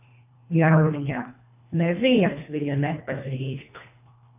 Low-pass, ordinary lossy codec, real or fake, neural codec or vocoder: 3.6 kHz; AAC, 24 kbps; fake; codec, 24 kHz, 1.5 kbps, HILCodec